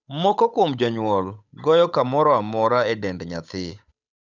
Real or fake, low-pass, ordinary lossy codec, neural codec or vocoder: fake; 7.2 kHz; none; codec, 16 kHz, 8 kbps, FunCodec, trained on Chinese and English, 25 frames a second